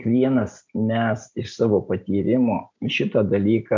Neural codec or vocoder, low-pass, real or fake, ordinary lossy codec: none; 7.2 kHz; real; MP3, 64 kbps